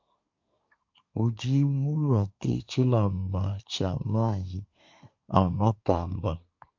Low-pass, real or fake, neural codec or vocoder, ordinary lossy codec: 7.2 kHz; fake; codec, 24 kHz, 1 kbps, SNAC; MP3, 48 kbps